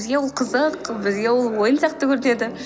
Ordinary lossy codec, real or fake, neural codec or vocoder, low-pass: none; real; none; none